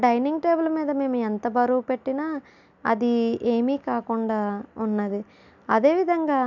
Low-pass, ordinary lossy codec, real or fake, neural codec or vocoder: 7.2 kHz; none; real; none